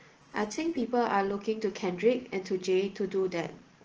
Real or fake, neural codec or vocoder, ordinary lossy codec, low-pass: real; none; Opus, 16 kbps; 7.2 kHz